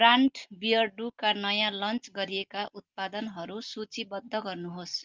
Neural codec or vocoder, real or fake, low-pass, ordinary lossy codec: none; real; 7.2 kHz; Opus, 32 kbps